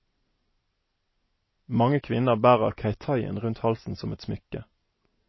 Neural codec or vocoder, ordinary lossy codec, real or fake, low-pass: none; MP3, 24 kbps; real; 7.2 kHz